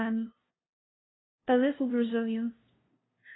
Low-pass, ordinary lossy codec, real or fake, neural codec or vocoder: 7.2 kHz; AAC, 16 kbps; fake; codec, 16 kHz, 0.5 kbps, FunCodec, trained on LibriTTS, 25 frames a second